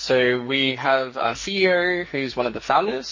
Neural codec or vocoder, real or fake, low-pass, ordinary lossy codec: codec, 44.1 kHz, 2.6 kbps, SNAC; fake; 7.2 kHz; MP3, 32 kbps